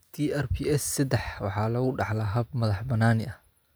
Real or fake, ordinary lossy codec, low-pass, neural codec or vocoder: real; none; none; none